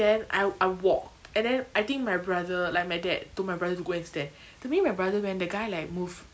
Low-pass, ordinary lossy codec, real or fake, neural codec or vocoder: none; none; real; none